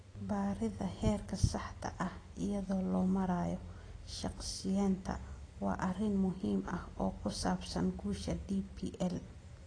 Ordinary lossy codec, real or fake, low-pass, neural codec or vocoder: AAC, 32 kbps; real; 9.9 kHz; none